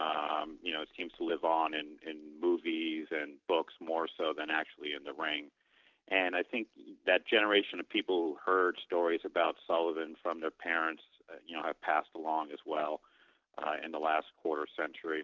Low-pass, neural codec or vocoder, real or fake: 7.2 kHz; none; real